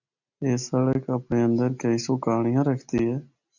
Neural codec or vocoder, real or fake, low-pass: none; real; 7.2 kHz